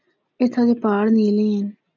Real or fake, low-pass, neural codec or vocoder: real; 7.2 kHz; none